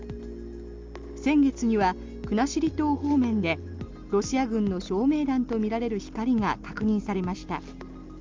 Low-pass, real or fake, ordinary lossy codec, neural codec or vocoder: 7.2 kHz; real; Opus, 32 kbps; none